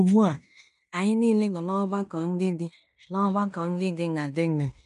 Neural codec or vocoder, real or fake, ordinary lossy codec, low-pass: codec, 16 kHz in and 24 kHz out, 0.9 kbps, LongCat-Audio-Codec, fine tuned four codebook decoder; fake; none; 10.8 kHz